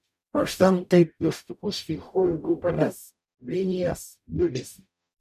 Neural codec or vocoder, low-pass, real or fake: codec, 44.1 kHz, 0.9 kbps, DAC; 14.4 kHz; fake